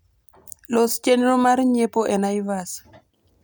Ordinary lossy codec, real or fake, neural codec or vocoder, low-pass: none; real; none; none